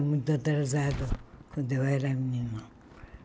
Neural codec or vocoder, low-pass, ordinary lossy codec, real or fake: none; none; none; real